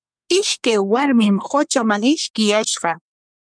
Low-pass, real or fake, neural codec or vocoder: 9.9 kHz; fake; codec, 24 kHz, 1 kbps, SNAC